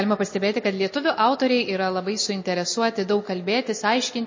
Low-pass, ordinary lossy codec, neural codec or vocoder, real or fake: 7.2 kHz; MP3, 32 kbps; none; real